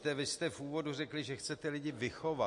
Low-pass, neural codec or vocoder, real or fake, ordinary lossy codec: 10.8 kHz; none; real; MP3, 48 kbps